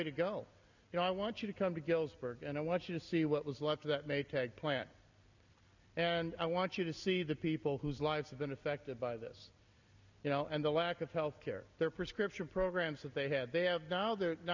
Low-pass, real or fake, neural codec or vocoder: 7.2 kHz; real; none